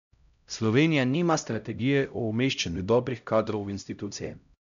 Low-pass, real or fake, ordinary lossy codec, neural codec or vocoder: 7.2 kHz; fake; none; codec, 16 kHz, 0.5 kbps, X-Codec, HuBERT features, trained on LibriSpeech